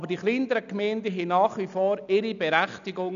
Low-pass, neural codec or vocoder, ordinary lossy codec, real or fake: 7.2 kHz; none; none; real